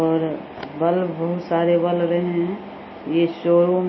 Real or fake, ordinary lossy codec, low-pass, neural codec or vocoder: real; MP3, 24 kbps; 7.2 kHz; none